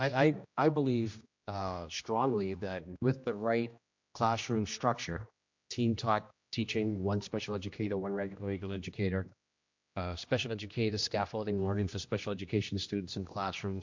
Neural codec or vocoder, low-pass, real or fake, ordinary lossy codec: codec, 16 kHz, 1 kbps, X-Codec, HuBERT features, trained on general audio; 7.2 kHz; fake; MP3, 48 kbps